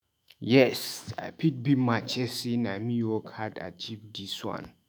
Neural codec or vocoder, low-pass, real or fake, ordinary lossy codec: autoencoder, 48 kHz, 128 numbers a frame, DAC-VAE, trained on Japanese speech; none; fake; none